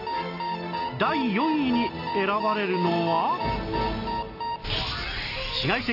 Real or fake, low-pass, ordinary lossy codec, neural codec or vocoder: real; 5.4 kHz; AAC, 32 kbps; none